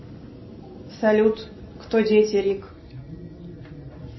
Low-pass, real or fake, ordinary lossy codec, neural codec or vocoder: 7.2 kHz; real; MP3, 24 kbps; none